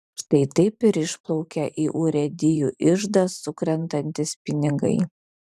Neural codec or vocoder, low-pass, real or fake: vocoder, 44.1 kHz, 128 mel bands every 512 samples, BigVGAN v2; 14.4 kHz; fake